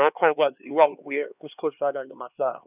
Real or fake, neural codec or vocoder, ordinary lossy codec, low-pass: fake; codec, 16 kHz, 2 kbps, X-Codec, HuBERT features, trained on LibriSpeech; none; 3.6 kHz